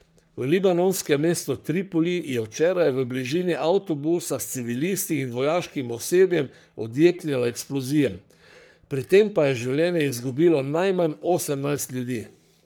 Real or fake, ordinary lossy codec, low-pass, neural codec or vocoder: fake; none; none; codec, 44.1 kHz, 3.4 kbps, Pupu-Codec